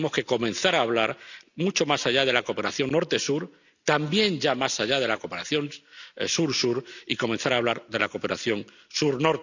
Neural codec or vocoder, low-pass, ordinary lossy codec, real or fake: none; 7.2 kHz; none; real